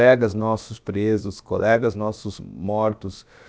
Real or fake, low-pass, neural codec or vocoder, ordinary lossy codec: fake; none; codec, 16 kHz, about 1 kbps, DyCAST, with the encoder's durations; none